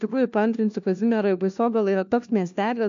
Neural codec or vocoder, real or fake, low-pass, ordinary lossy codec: codec, 16 kHz, 1 kbps, FunCodec, trained on LibriTTS, 50 frames a second; fake; 7.2 kHz; AAC, 64 kbps